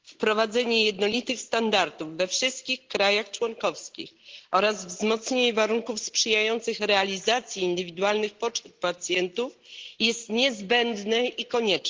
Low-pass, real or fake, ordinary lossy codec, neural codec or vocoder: 7.2 kHz; real; Opus, 16 kbps; none